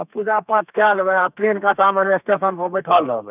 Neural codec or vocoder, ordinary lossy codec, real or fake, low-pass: codec, 32 kHz, 1.9 kbps, SNAC; none; fake; 3.6 kHz